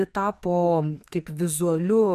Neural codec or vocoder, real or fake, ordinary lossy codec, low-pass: codec, 44.1 kHz, 2.6 kbps, SNAC; fake; MP3, 96 kbps; 14.4 kHz